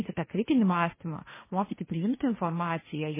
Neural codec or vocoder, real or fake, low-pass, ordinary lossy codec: codec, 16 kHz, 1.1 kbps, Voila-Tokenizer; fake; 3.6 kHz; MP3, 16 kbps